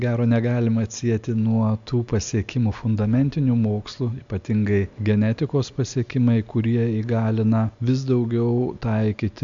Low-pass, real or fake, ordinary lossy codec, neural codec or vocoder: 7.2 kHz; real; MP3, 64 kbps; none